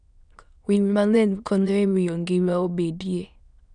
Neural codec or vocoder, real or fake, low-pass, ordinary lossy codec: autoencoder, 22.05 kHz, a latent of 192 numbers a frame, VITS, trained on many speakers; fake; 9.9 kHz; none